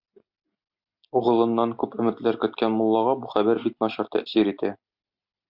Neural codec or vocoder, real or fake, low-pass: none; real; 5.4 kHz